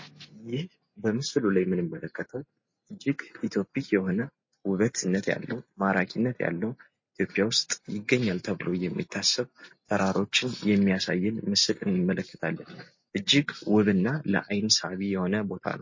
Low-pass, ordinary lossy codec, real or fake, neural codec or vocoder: 7.2 kHz; MP3, 32 kbps; real; none